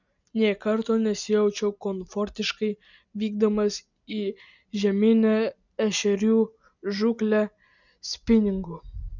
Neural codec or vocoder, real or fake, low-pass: none; real; 7.2 kHz